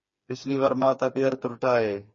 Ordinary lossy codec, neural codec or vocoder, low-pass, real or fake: MP3, 32 kbps; codec, 16 kHz, 4 kbps, FreqCodec, smaller model; 7.2 kHz; fake